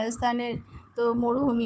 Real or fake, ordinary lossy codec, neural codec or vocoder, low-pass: fake; none; codec, 16 kHz, 4 kbps, FunCodec, trained on Chinese and English, 50 frames a second; none